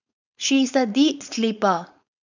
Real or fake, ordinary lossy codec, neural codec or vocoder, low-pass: fake; none; codec, 16 kHz, 4.8 kbps, FACodec; 7.2 kHz